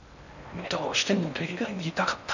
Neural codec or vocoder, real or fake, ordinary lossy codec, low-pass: codec, 16 kHz in and 24 kHz out, 0.6 kbps, FocalCodec, streaming, 4096 codes; fake; none; 7.2 kHz